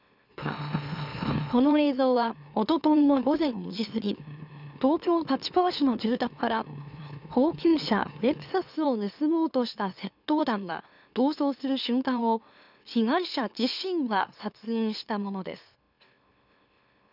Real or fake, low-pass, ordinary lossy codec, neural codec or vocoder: fake; 5.4 kHz; none; autoencoder, 44.1 kHz, a latent of 192 numbers a frame, MeloTTS